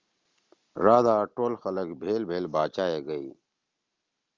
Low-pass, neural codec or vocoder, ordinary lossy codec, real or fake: 7.2 kHz; none; Opus, 32 kbps; real